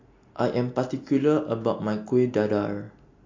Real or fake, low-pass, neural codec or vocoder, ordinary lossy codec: real; 7.2 kHz; none; MP3, 48 kbps